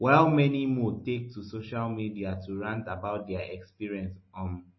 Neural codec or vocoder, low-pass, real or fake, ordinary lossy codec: none; 7.2 kHz; real; MP3, 24 kbps